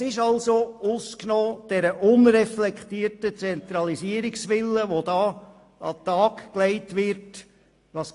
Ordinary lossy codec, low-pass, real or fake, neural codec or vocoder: AAC, 48 kbps; 10.8 kHz; real; none